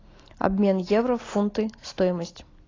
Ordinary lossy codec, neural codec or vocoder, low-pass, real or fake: AAC, 32 kbps; none; 7.2 kHz; real